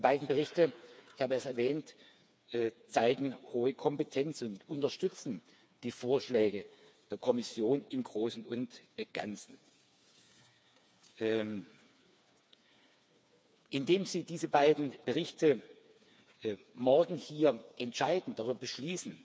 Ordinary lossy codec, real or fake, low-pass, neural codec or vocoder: none; fake; none; codec, 16 kHz, 4 kbps, FreqCodec, smaller model